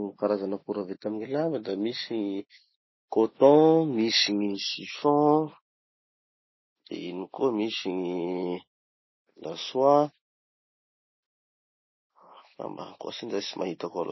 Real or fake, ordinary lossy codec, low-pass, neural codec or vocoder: real; MP3, 24 kbps; 7.2 kHz; none